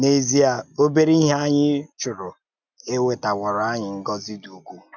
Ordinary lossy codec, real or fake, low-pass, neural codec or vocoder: none; real; 7.2 kHz; none